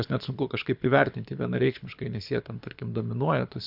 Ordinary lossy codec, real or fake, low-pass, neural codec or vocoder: AAC, 48 kbps; fake; 5.4 kHz; codec, 24 kHz, 6 kbps, HILCodec